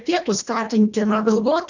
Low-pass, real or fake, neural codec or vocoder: 7.2 kHz; fake; codec, 24 kHz, 1.5 kbps, HILCodec